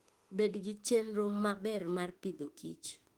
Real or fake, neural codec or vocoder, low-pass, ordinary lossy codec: fake; autoencoder, 48 kHz, 32 numbers a frame, DAC-VAE, trained on Japanese speech; 19.8 kHz; Opus, 24 kbps